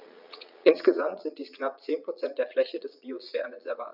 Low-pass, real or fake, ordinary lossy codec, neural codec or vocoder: 5.4 kHz; fake; none; vocoder, 22.05 kHz, 80 mel bands, Vocos